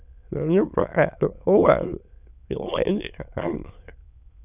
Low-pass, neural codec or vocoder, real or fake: 3.6 kHz; autoencoder, 22.05 kHz, a latent of 192 numbers a frame, VITS, trained on many speakers; fake